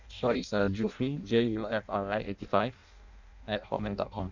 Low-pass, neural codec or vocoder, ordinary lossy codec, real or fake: 7.2 kHz; codec, 16 kHz in and 24 kHz out, 0.6 kbps, FireRedTTS-2 codec; none; fake